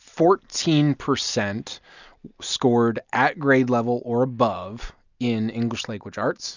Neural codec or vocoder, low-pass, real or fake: none; 7.2 kHz; real